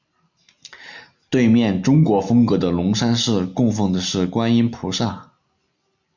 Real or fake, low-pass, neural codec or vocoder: real; 7.2 kHz; none